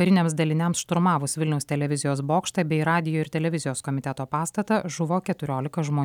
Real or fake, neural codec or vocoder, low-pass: real; none; 19.8 kHz